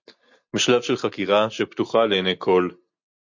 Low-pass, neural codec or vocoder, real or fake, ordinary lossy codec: 7.2 kHz; none; real; MP3, 48 kbps